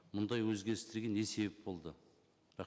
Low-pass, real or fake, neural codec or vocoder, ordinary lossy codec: none; real; none; none